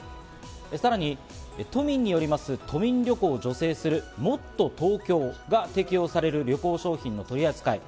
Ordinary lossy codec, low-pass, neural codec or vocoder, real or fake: none; none; none; real